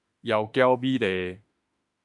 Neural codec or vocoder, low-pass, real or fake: autoencoder, 48 kHz, 32 numbers a frame, DAC-VAE, trained on Japanese speech; 10.8 kHz; fake